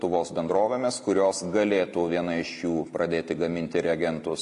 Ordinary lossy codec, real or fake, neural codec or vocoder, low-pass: MP3, 48 kbps; real; none; 14.4 kHz